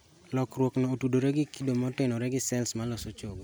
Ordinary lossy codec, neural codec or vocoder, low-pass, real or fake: none; none; none; real